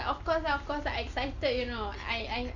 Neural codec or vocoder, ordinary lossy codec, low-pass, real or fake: none; Opus, 64 kbps; 7.2 kHz; real